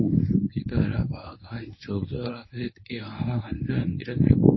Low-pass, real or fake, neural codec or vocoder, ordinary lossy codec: 7.2 kHz; fake; codec, 24 kHz, 0.9 kbps, WavTokenizer, medium speech release version 1; MP3, 24 kbps